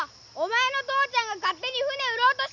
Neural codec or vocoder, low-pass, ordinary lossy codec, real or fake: none; 7.2 kHz; none; real